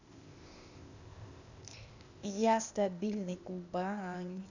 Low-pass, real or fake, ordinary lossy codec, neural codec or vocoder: 7.2 kHz; fake; none; codec, 16 kHz, 0.8 kbps, ZipCodec